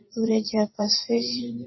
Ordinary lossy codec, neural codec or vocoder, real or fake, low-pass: MP3, 24 kbps; vocoder, 44.1 kHz, 128 mel bands every 512 samples, BigVGAN v2; fake; 7.2 kHz